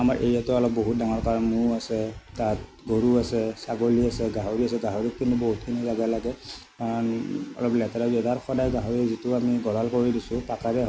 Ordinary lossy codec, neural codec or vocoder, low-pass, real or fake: none; none; none; real